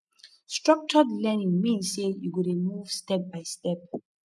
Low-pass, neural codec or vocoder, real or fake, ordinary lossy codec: none; none; real; none